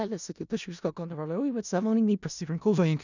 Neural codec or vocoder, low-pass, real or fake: codec, 16 kHz in and 24 kHz out, 0.4 kbps, LongCat-Audio-Codec, four codebook decoder; 7.2 kHz; fake